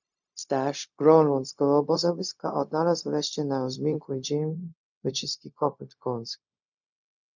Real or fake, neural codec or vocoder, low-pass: fake; codec, 16 kHz, 0.4 kbps, LongCat-Audio-Codec; 7.2 kHz